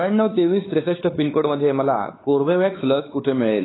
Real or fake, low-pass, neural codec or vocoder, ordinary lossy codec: fake; 7.2 kHz; codec, 16 kHz, 4 kbps, X-Codec, WavLM features, trained on Multilingual LibriSpeech; AAC, 16 kbps